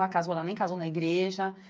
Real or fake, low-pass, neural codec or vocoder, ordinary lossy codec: fake; none; codec, 16 kHz, 4 kbps, FreqCodec, smaller model; none